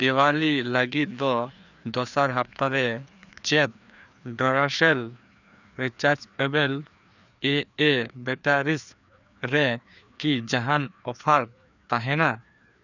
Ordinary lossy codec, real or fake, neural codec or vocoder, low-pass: none; fake; codec, 16 kHz, 2 kbps, FreqCodec, larger model; 7.2 kHz